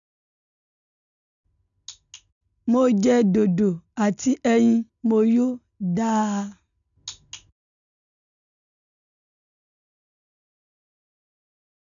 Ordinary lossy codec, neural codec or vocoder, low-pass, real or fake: none; none; 7.2 kHz; real